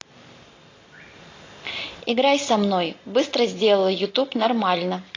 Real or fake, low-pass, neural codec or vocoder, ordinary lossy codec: real; 7.2 kHz; none; AAC, 32 kbps